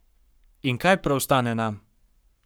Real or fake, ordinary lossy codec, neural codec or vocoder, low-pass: fake; none; codec, 44.1 kHz, 7.8 kbps, Pupu-Codec; none